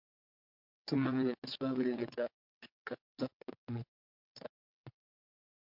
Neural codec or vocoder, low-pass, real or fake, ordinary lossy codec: vocoder, 22.05 kHz, 80 mel bands, WaveNeXt; 5.4 kHz; fake; MP3, 48 kbps